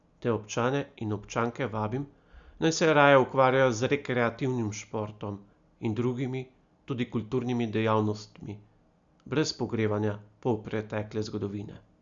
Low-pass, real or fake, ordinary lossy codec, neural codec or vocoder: 7.2 kHz; real; Opus, 64 kbps; none